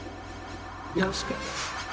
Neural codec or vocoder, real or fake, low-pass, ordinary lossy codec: codec, 16 kHz, 0.4 kbps, LongCat-Audio-Codec; fake; none; none